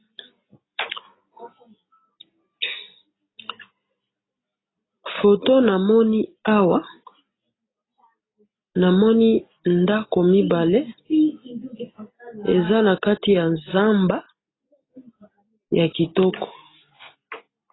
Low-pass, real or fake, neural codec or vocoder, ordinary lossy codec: 7.2 kHz; real; none; AAC, 16 kbps